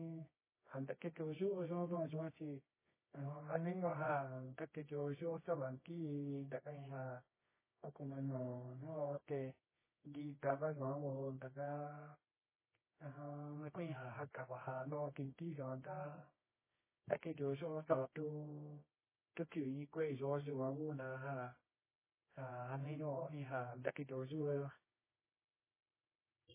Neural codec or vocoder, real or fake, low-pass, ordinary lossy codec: codec, 24 kHz, 0.9 kbps, WavTokenizer, medium music audio release; fake; 3.6 kHz; MP3, 16 kbps